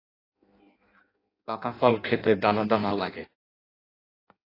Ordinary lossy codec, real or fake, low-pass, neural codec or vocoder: AAC, 24 kbps; fake; 5.4 kHz; codec, 16 kHz in and 24 kHz out, 0.6 kbps, FireRedTTS-2 codec